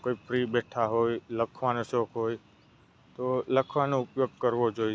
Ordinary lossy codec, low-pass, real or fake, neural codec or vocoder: none; none; real; none